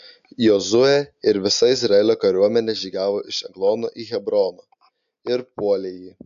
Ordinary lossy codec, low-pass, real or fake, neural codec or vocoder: AAC, 96 kbps; 7.2 kHz; real; none